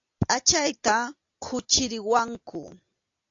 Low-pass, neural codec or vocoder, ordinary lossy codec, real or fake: 7.2 kHz; none; AAC, 48 kbps; real